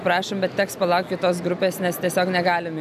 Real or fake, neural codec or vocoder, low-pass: real; none; 14.4 kHz